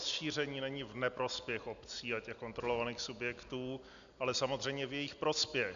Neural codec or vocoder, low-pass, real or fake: none; 7.2 kHz; real